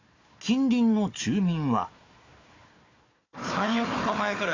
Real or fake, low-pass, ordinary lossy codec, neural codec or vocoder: fake; 7.2 kHz; AAC, 32 kbps; codec, 16 kHz, 4 kbps, FunCodec, trained on Chinese and English, 50 frames a second